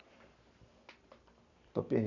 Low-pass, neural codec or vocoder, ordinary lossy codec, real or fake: 7.2 kHz; none; none; real